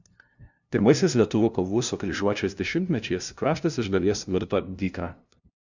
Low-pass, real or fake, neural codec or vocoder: 7.2 kHz; fake; codec, 16 kHz, 0.5 kbps, FunCodec, trained on LibriTTS, 25 frames a second